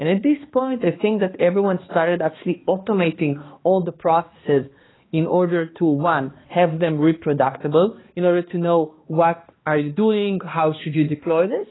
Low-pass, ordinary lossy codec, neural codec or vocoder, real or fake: 7.2 kHz; AAC, 16 kbps; codec, 16 kHz, 2 kbps, X-Codec, HuBERT features, trained on balanced general audio; fake